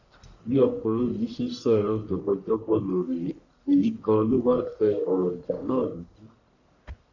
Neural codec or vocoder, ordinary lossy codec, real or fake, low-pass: codec, 44.1 kHz, 1.7 kbps, Pupu-Codec; none; fake; 7.2 kHz